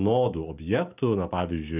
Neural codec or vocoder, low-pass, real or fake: none; 3.6 kHz; real